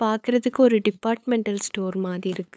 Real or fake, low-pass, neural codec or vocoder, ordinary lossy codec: fake; none; codec, 16 kHz, 8 kbps, FunCodec, trained on LibriTTS, 25 frames a second; none